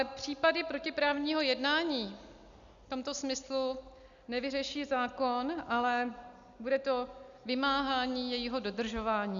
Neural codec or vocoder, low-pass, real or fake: none; 7.2 kHz; real